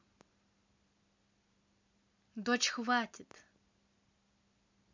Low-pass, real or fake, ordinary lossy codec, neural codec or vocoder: 7.2 kHz; real; MP3, 64 kbps; none